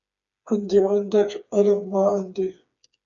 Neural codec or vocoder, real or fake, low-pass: codec, 16 kHz, 4 kbps, FreqCodec, smaller model; fake; 7.2 kHz